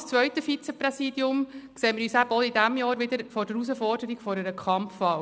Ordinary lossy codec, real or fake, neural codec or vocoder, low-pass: none; real; none; none